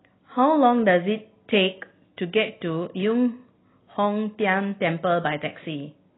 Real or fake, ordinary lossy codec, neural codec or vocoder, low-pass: real; AAC, 16 kbps; none; 7.2 kHz